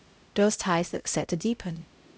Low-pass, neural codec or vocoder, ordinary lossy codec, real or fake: none; codec, 16 kHz, 0.5 kbps, X-Codec, HuBERT features, trained on LibriSpeech; none; fake